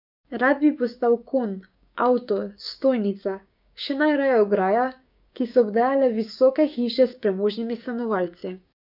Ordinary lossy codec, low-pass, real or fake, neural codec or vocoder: none; 5.4 kHz; fake; codec, 16 kHz, 6 kbps, DAC